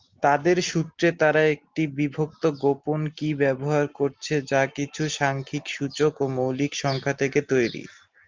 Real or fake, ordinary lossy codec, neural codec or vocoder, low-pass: real; Opus, 24 kbps; none; 7.2 kHz